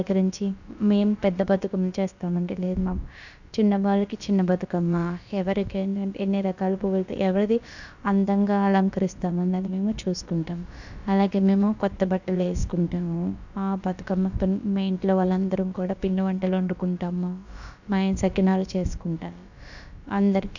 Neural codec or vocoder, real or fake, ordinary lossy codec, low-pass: codec, 16 kHz, about 1 kbps, DyCAST, with the encoder's durations; fake; none; 7.2 kHz